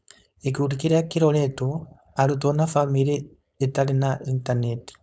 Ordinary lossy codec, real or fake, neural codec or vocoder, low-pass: none; fake; codec, 16 kHz, 4.8 kbps, FACodec; none